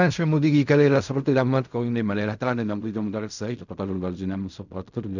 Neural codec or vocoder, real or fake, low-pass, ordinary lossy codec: codec, 16 kHz in and 24 kHz out, 0.4 kbps, LongCat-Audio-Codec, fine tuned four codebook decoder; fake; 7.2 kHz; none